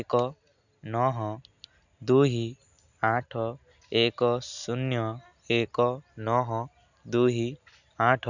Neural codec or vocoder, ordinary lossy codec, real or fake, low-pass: none; none; real; 7.2 kHz